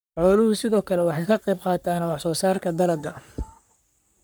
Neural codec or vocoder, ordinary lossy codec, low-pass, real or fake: codec, 44.1 kHz, 3.4 kbps, Pupu-Codec; none; none; fake